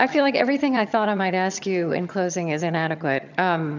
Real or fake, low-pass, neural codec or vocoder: fake; 7.2 kHz; vocoder, 22.05 kHz, 80 mel bands, HiFi-GAN